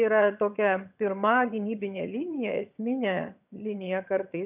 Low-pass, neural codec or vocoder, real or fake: 3.6 kHz; vocoder, 22.05 kHz, 80 mel bands, HiFi-GAN; fake